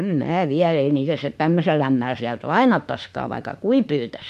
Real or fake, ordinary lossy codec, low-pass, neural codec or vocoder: fake; MP3, 64 kbps; 14.4 kHz; autoencoder, 48 kHz, 32 numbers a frame, DAC-VAE, trained on Japanese speech